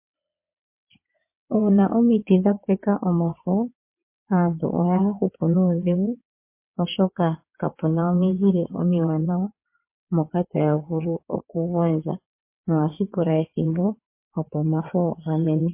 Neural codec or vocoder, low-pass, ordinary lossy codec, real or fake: vocoder, 22.05 kHz, 80 mel bands, WaveNeXt; 3.6 kHz; MP3, 24 kbps; fake